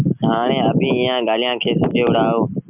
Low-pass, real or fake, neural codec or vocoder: 3.6 kHz; real; none